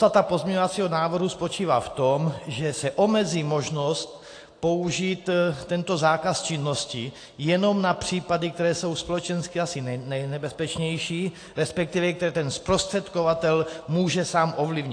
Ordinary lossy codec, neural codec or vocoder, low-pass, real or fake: AAC, 48 kbps; none; 9.9 kHz; real